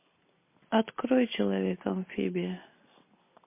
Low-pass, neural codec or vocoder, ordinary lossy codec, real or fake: 3.6 kHz; none; MP3, 24 kbps; real